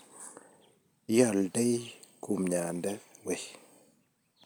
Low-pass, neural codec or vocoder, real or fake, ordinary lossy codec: none; none; real; none